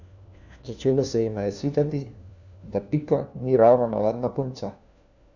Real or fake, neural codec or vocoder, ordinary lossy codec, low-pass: fake; codec, 16 kHz, 1 kbps, FunCodec, trained on LibriTTS, 50 frames a second; none; 7.2 kHz